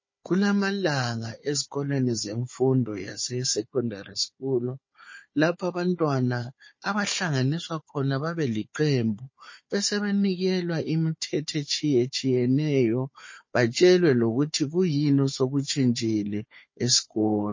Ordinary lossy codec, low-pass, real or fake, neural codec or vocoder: MP3, 32 kbps; 7.2 kHz; fake; codec, 16 kHz, 4 kbps, FunCodec, trained on Chinese and English, 50 frames a second